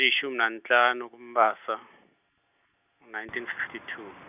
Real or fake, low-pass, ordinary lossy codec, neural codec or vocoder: real; 3.6 kHz; none; none